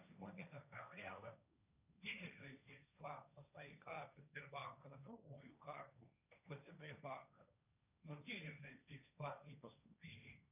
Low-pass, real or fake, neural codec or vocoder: 3.6 kHz; fake; codec, 16 kHz, 1.1 kbps, Voila-Tokenizer